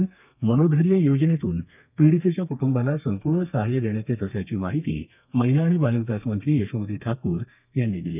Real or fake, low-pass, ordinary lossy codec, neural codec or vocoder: fake; 3.6 kHz; none; codec, 32 kHz, 1.9 kbps, SNAC